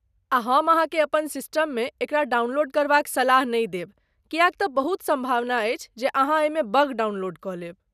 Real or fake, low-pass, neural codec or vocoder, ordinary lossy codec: real; 14.4 kHz; none; none